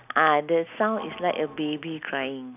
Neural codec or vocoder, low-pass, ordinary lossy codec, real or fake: none; 3.6 kHz; none; real